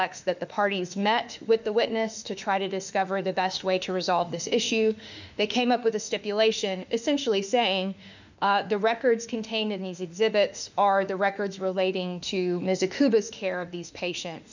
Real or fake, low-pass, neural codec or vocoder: fake; 7.2 kHz; autoencoder, 48 kHz, 32 numbers a frame, DAC-VAE, trained on Japanese speech